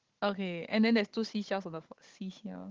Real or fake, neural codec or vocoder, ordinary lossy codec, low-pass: fake; codec, 16 kHz, 16 kbps, FunCodec, trained on Chinese and English, 50 frames a second; Opus, 16 kbps; 7.2 kHz